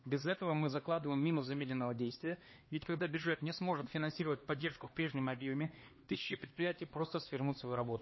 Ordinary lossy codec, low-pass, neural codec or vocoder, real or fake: MP3, 24 kbps; 7.2 kHz; codec, 16 kHz, 2 kbps, X-Codec, HuBERT features, trained on LibriSpeech; fake